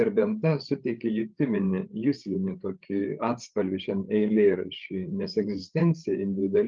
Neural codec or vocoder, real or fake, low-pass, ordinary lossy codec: codec, 16 kHz, 8 kbps, FreqCodec, larger model; fake; 7.2 kHz; Opus, 24 kbps